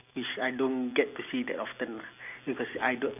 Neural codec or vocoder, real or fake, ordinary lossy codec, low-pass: none; real; none; 3.6 kHz